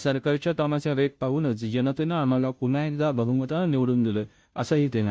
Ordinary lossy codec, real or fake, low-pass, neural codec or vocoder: none; fake; none; codec, 16 kHz, 0.5 kbps, FunCodec, trained on Chinese and English, 25 frames a second